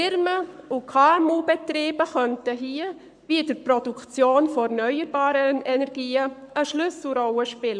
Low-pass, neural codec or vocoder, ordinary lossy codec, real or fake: 9.9 kHz; codec, 44.1 kHz, 7.8 kbps, Pupu-Codec; none; fake